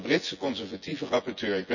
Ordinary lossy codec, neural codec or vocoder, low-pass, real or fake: none; vocoder, 24 kHz, 100 mel bands, Vocos; 7.2 kHz; fake